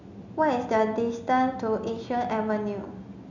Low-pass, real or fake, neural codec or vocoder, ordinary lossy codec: 7.2 kHz; real; none; none